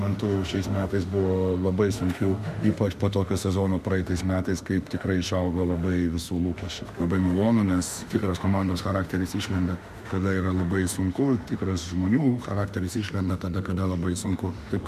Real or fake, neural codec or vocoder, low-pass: fake; autoencoder, 48 kHz, 32 numbers a frame, DAC-VAE, trained on Japanese speech; 14.4 kHz